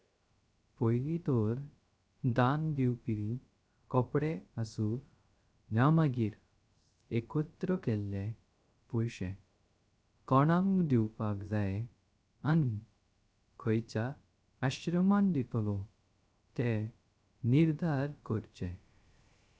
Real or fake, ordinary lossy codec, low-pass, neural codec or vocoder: fake; none; none; codec, 16 kHz, 0.3 kbps, FocalCodec